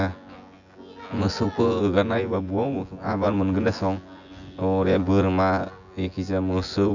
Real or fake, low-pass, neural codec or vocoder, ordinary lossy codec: fake; 7.2 kHz; vocoder, 24 kHz, 100 mel bands, Vocos; none